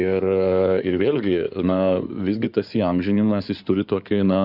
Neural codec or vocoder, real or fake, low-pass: codec, 16 kHz in and 24 kHz out, 2.2 kbps, FireRedTTS-2 codec; fake; 5.4 kHz